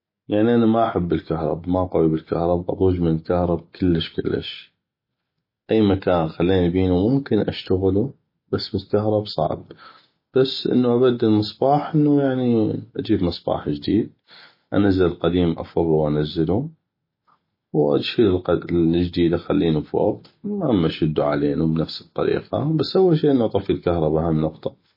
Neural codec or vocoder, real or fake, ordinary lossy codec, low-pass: none; real; MP3, 24 kbps; 5.4 kHz